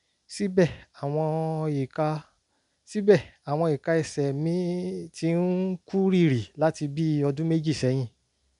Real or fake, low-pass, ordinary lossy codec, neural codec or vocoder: real; 10.8 kHz; none; none